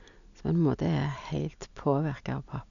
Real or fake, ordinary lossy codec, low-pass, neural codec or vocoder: real; none; 7.2 kHz; none